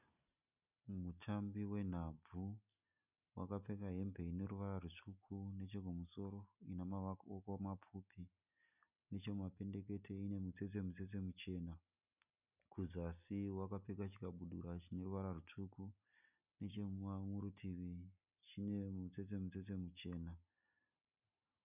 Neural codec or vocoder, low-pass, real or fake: none; 3.6 kHz; real